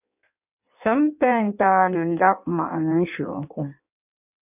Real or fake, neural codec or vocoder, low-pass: fake; codec, 16 kHz in and 24 kHz out, 1.1 kbps, FireRedTTS-2 codec; 3.6 kHz